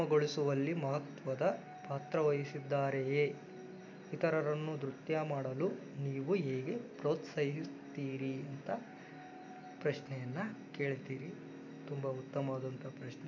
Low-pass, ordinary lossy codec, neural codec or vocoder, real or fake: 7.2 kHz; none; none; real